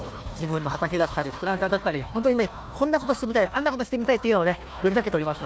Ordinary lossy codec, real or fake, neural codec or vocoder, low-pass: none; fake; codec, 16 kHz, 1 kbps, FunCodec, trained on Chinese and English, 50 frames a second; none